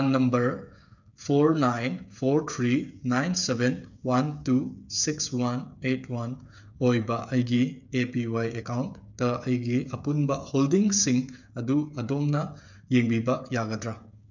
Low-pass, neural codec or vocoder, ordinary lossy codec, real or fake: 7.2 kHz; codec, 16 kHz, 8 kbps, FreqCodec, smaller model; none; fake